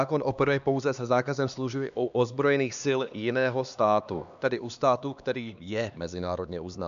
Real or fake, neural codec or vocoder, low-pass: fake; codec, 16 kHz, 2 kbps, X-Codec, HuBERT features, trained on LibriSpeech; 7.2 kHz